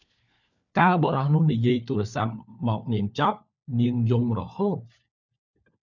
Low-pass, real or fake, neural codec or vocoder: 7.2 kHz; fake; codec, 16 kHz, 4 kbps, FunCodec, trained on LibriTTS, 50 frames a second